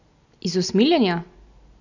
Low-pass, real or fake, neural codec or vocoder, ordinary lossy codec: 7.2 kHz; real; none; none